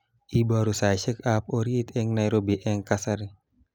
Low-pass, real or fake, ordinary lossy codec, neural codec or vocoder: 19.8 kHz; real; none; none